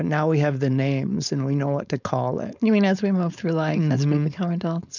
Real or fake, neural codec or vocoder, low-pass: fake; codec, 16 kHz, 4.8 kbps, FACodec; 7.2 kHz